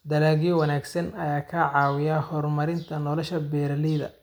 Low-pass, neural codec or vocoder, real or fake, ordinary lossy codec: none; none; real; none